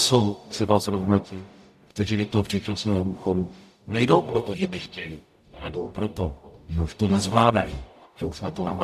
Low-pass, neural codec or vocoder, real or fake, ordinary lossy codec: 14.4 kHz; codec, 44.1 kHz, 0.9 kbps, DAC; fake; AAC, 96 kbps